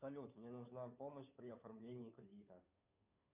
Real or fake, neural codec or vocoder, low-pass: fake; codec, 16 kHz, 2 kbps, FunCodec, trained on Chinese and English, 25 frames a second; 3.6 kHz